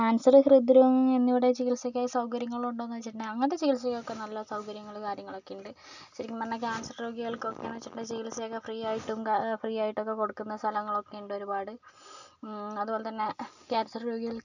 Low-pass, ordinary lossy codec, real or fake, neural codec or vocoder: 7.2 kHz; none; real; none